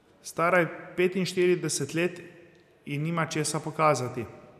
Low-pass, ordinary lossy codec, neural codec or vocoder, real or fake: 14.4 kHz; none; none; real